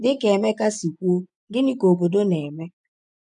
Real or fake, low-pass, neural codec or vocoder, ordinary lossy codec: fake; 10.8 kHz; vocoder, 24 kHz, 100 mel bands, Vocos; none